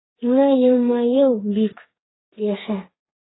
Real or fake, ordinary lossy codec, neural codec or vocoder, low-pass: fake; AAC, 16 kbps; codec, 32 kHz, 1.9 kbps, SNAC; 7.2 kHz